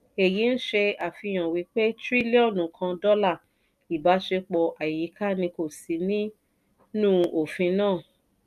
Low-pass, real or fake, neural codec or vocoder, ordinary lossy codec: 14.4 kHz; real; none; MP3, 96 kbps